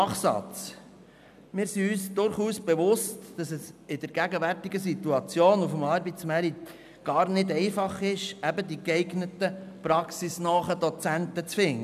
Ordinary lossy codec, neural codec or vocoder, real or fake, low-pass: none; none; real; 14.4 kHz